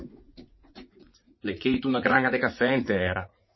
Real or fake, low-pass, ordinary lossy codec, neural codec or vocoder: fake; 7.2 kHz; MP3, 24 kbps; vocoder, 44.1 kHz, 80 mel bands, Vocos